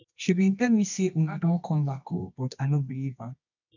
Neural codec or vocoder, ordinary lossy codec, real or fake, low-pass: codec, 24 kHz, 0.9 kbps, WavTokenizer, medium music audio release; none; fake; 7.2 kHz